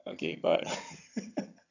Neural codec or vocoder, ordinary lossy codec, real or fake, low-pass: vocoder, 22.05 kHz, 80 mel bands, HiFi-GAN; none; fake; 7.2 kHz